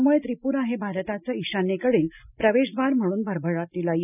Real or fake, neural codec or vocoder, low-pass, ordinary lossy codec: real; none; 3.6 kHz; none